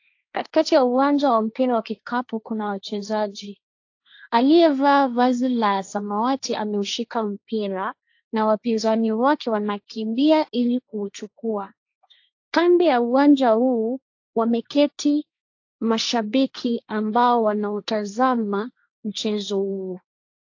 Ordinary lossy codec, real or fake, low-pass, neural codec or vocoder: AAC, 48 kbps; fake; 7.2 kHz; codec, 16 kHz, 1.1 kbps, Voila-Tokenizer